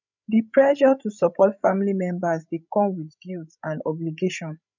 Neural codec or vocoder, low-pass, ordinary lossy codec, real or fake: codec, 16 kHz, 16 kbps, FreqCodec, larger model; 7.2 kHz; none; fake